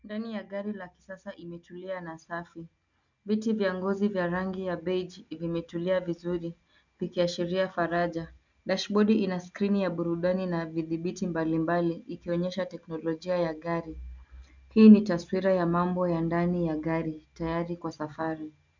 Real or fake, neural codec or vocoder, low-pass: real; none; 7.2 kHz